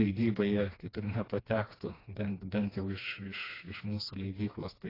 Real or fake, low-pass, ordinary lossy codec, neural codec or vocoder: fake; 5.4 kHz; AAC, 24 kbps; codec, 16 kHz, 2 kbps, FreqCodec, smaller model